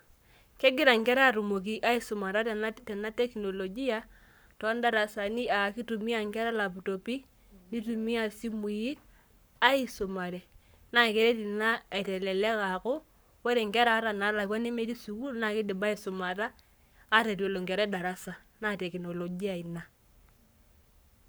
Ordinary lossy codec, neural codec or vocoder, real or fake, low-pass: none; codec, 44.1 kHz, 7.8 kbps, Pupu-Codec; fake; none